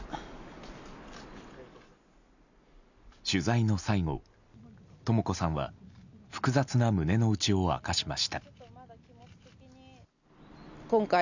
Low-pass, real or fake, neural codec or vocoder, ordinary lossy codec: 7.2 kHz; real; none; none